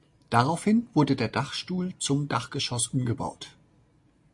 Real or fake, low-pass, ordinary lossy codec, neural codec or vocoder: real; 10.8 kHz; MP3, 64 kbps; none